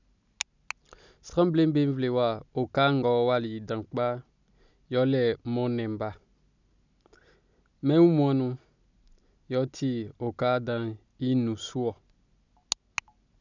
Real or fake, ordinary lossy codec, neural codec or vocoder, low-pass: real; none; none; 7.2 kHz